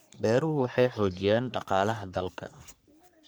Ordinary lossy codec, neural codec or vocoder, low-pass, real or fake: none; codec, 44.1 kHz, 3.4 kbps, Pupu-Codec; none; fake